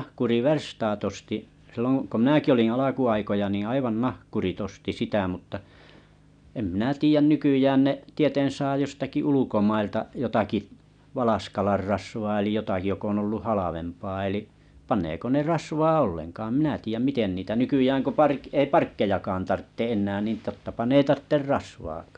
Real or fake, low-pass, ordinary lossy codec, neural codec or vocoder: real; 9.9 kHz; none; none